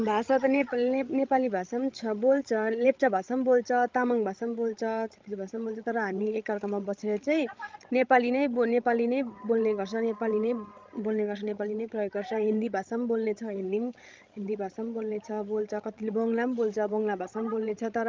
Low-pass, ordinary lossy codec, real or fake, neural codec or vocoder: 7.2 kHz; Opus, 24 kbps; fake; codec, 16 kHz, 16 kbps, FreqCodec, larger model